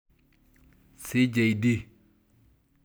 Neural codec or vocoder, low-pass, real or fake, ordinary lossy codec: none; none; real; none